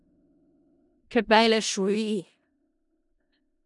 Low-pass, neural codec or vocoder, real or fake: 10.8 kHz; codec, 16 kHz in and 24 kHz out, 0.4 kbps, LongCat-Audio-Codec, four codebook decoder; fake